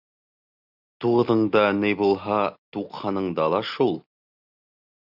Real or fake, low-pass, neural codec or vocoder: real; 5.4 kHz; none